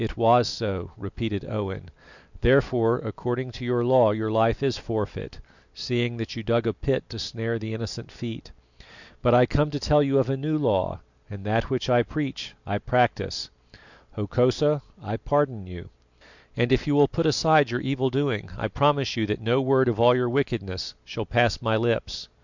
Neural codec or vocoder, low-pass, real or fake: none; 7.2 kHz; real